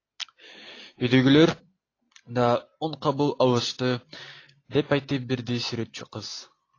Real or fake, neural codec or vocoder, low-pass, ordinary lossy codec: real; none; 7.2 kHz; AAC, 32 kbps